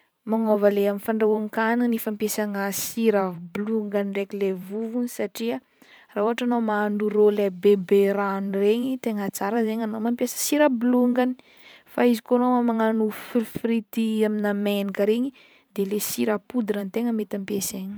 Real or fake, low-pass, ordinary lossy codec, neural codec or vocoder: fake; none; none; vocoder, 44.1 kHz, 128 mel bands every 512 samples, BigVGAN v2